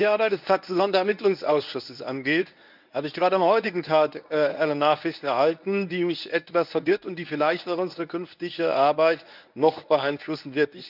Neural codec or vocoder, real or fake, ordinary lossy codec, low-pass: codec, 24 kHz, 0.9 kbps, WavTokenizer, medium speech release version 1; fake; none; 5.4 kHz